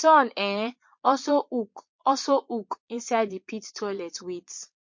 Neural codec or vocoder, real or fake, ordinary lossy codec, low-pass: vocoder, 22.05 kHz, 80 mel bands, Vocos; fake; MP3, 48 kbps; 7.2 kHz